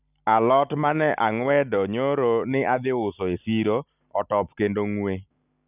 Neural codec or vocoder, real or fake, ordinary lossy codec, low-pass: none; real; none; 3.6 kHz